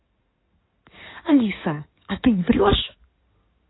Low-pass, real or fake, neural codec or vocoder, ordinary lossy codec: 7.2 kHz; fake; codec, 16 kHz in and 24 kHz out, 2.2 kbps, FireRedTTS-2 codec; AAC, 16 kbps